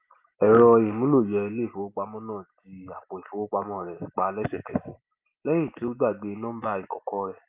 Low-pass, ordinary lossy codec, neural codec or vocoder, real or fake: 3.6 kHz; Opus, 32 kbps; none; real